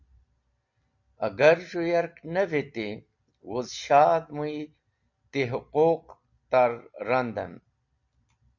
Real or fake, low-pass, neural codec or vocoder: real; 7.2 kHz; none